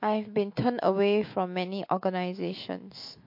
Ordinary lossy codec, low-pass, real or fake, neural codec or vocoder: MP3, 32 kbps; 5.4 kHz; fake; autoencoder, 48 kHz, 128 numbers a frame, DAC-VAE, trained on Japanese speech